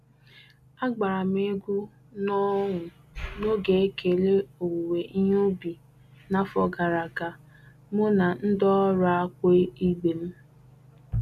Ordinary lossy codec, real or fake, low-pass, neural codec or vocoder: none; real; 14.4 kHz; none